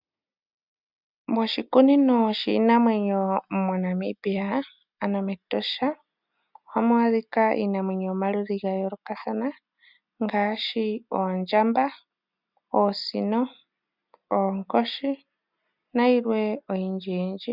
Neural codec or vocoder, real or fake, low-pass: none; real; 5.4 kHz